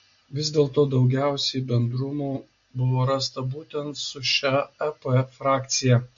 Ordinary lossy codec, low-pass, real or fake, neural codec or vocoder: MP3, 48 kbps; 7.2 kHz; real; none